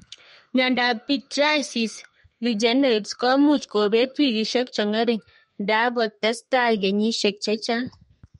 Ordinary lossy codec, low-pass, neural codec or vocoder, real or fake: MP3, 48 kbps; 14.4 kHz; codec, 32 kHz, 1.9 kbps, SNAC; fake